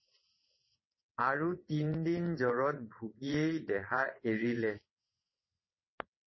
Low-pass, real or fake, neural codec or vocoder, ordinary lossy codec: 7.2 kHz; fake; vocoder, 22.05 kHz, 80 mel bands, WaveNeXt; MP3, 24 kbps